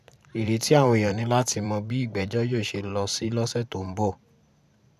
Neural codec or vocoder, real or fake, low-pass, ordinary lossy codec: vocoder, 44.1 kHz, 128 mel bands every 512 samples, BigVGAN v2; fake; 14.4 kHz; none